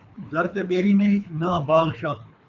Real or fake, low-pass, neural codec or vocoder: fake; 7.2 kHz; codec, 24 kHz, 3 kbps, HILCodec